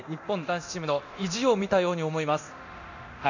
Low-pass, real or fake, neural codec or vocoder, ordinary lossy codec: 7.2 kHz; fake; codec, 24 kHz, 0.9 kbps, DualCodec; none